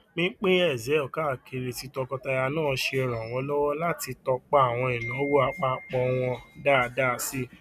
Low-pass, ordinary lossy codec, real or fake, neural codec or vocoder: 14.4 kHz; none; real; none